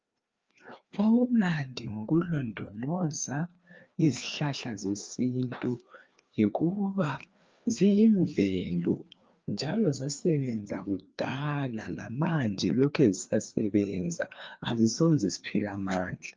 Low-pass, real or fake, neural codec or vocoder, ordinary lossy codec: 7.2 kHz; fake; codec, 16 kHz, 2 kbps, FreqCodec, larger model; Opus, 24 kbps